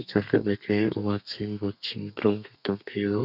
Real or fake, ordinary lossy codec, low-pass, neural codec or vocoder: fake; none; 5.4 kHz; codec, 32 kHz, 1.9 kbps, SNAC